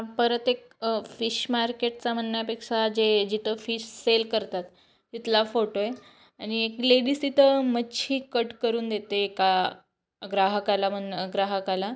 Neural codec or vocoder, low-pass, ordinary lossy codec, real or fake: none; none; none; real